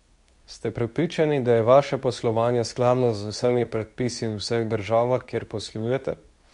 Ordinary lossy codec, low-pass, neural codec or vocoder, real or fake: none; 10.8 kHz; codec, 24 kHz, 0.9 kbps, WavTokenizer, medium speech release version 2; fake